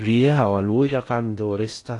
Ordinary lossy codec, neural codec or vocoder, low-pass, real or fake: AAC, 48 kbps; codec, 16 kHz in and 24 kHz out, 0.6 kbps, FocalCodec, streaming, 4096 codes; 10.8 kHz; fake